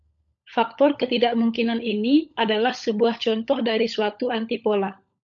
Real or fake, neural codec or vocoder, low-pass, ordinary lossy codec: fake; codec, 16 kHz, 16 kbps, FunCodec, trained on LibriTTS, 50 frames a second; 7.2 kHz; MP3, 64 kbps